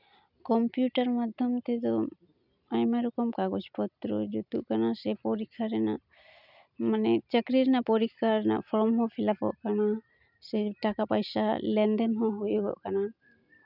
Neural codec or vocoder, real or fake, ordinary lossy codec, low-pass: none; real; none; 5.4 kHz